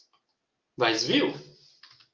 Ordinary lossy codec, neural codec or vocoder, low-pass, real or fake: Opus, 24 kbps; none; 7.2 kHz; real